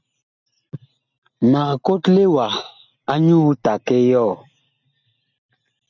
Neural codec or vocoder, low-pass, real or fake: none; 7.2 kHz; real